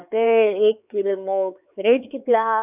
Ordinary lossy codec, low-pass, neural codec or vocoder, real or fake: none; 3.6 kHz; codec, 16 kHz, 4 kbps, X-Codec, HuBERT features, trained on LibriSpeech; fake